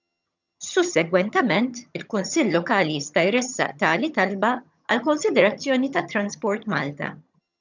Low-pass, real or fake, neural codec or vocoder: 7.2 kHz; fake; vocoder, 22.05 kHz, 80 mel bands, HiFi-GAN